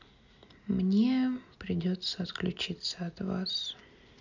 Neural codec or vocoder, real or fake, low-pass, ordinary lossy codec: none; real; 7.2 kHz; none